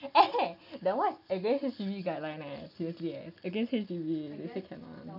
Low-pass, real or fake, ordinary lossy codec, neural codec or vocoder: 5.4 kHz; real; none; none